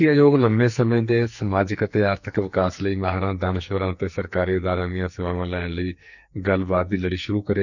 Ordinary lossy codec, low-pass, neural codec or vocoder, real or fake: none; 7.2 kHz; codec, 44.1 kHz, 2.6 kbps, SNAC; fake